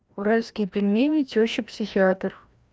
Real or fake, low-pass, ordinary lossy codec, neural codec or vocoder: fake; none; none; codec, 16 kHz, 1 kbps, FreqCodec, larger model